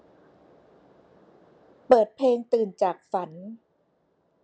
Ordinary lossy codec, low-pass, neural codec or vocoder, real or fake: none; none; none; real